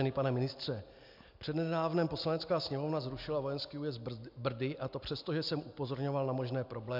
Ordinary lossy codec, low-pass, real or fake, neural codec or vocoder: MP3, 48 kbps; 5.4 kHz; real; none